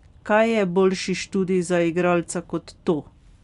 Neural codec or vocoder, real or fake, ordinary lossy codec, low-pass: none; real; none; 10.8 kHz